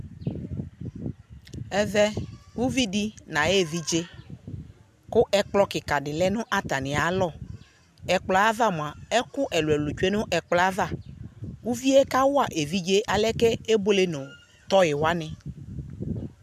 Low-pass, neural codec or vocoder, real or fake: 14.4 kHz; none; real